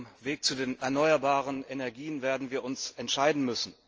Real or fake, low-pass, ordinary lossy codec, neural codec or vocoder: real; 7.2 kHz; Opus, 24 kbps; none